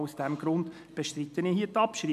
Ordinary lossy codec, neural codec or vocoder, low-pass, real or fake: none; none; 14.4 kHz; real